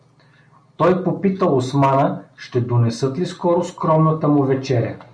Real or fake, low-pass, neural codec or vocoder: real; 9.9 kHz; none